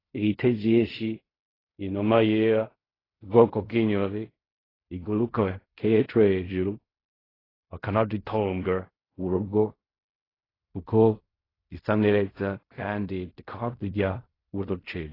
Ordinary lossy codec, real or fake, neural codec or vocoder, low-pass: AAC, 24 kbps; fake; codec, 16 kHz in and 24 kHz out, 0.4 kbps, LongCat-Audio-Codec, fine tuned four codebook decoder; 5.4 kHz